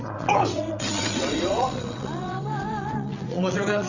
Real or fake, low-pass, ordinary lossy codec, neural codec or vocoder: fake; 7.2 kHz; Opus, 64 kbps; vocoder, 22.05 kHz, 80 mel bands, WaveNeXt